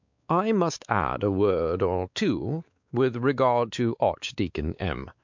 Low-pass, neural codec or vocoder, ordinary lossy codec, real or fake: 7.2 kHz; codec, 16 kHz, 4 kbps, X-Codec, WavLM features, trained on Multilingual LibriSpeech; MP3, 64 kbps; fake